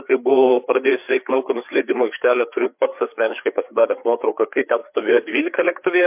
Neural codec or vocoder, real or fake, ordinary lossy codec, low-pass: codec, 16 kHz, 4 kbps, FreqCodec, larger model; fake; MP3, 32 kbps; 3.6 kHz